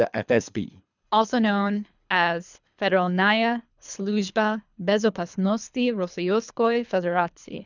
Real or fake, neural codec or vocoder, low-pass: fake; codec, 24 kHz, 3 kbps, HILCodec; 7.2 kHz